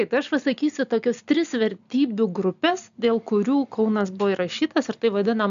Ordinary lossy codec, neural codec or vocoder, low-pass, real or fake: AAC, 96 kbps; none; 7.2 kHz; real